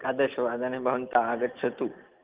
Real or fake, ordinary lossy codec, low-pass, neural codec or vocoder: real; Opus, 32 kbps; 3.6 kHz; none